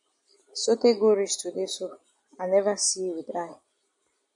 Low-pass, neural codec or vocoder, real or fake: 10.8 kHz; none; real